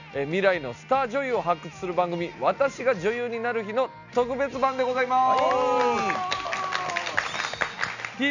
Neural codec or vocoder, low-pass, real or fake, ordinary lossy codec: none; 7.2 kHz; real; none